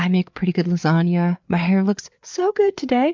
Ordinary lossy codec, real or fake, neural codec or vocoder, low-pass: MP3, 64 kbps; fake; codec, 16 kHz, 4 kbps, FreqCodec, larger model; 7.2 kHz